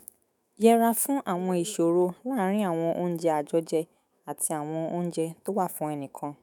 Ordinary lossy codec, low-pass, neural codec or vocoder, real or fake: none; none; autoencoder, 48 kHz, 128 numbers a frame, DAC-VAE, trained on Japanese speech; fake